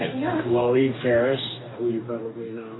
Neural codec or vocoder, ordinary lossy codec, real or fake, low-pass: codec, 44.1 kHz, 2.6 kbps, DAC; AAC, 16 kbps; fake; 7.2 kHz